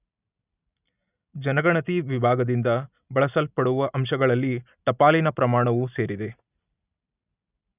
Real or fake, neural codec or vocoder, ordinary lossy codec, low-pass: real; none; none; 3.6 kHz